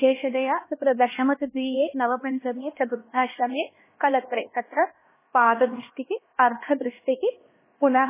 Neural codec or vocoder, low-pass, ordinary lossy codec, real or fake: codec, 16 kHz, 1 kbps, X-Codec, HuBERT features, trained on LibriSpeech; 3.6 kHz; MP3, 16 kbps; fake